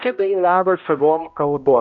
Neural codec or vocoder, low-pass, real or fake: codec, 16 kHz, 0.5 kbps, X-Codec, HuBERT features, trained on balanced general audio; 7.2 kHz; fake